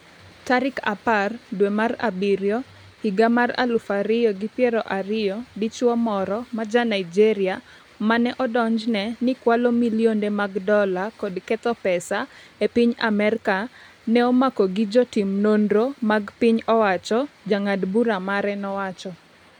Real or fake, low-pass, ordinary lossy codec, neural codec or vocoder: real; 19.8 kHz; none; none